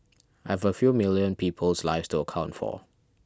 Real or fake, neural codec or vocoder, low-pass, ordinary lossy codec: real; none; none; none